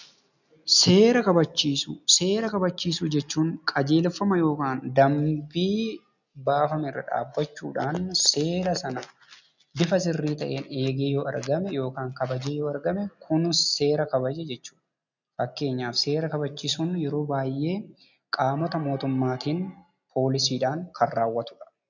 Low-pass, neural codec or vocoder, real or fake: 7.2 kHz; none; real